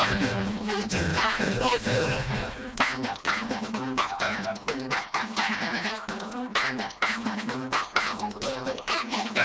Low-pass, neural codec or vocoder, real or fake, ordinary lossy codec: none; codec, 16 kHz, 1 kbps, FreqCodec, smaller model; fake; none